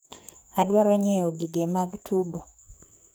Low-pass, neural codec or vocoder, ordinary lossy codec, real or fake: none; codec, 44.1 kHz, 2.6 kbps, SNAC; none; fake